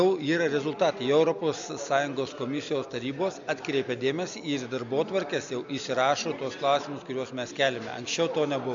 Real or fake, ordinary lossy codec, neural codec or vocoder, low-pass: real; MP3, 64 kbps; none; 7.2 kHz